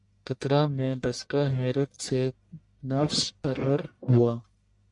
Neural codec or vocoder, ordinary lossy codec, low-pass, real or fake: codec, 44.1 kHz, 1.7 kbps, Pupu-Codec; MP3, 64 kbps; 10.8 kHz; fake